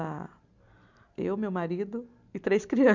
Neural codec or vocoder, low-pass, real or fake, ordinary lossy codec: none; 7.2 kHz; real; none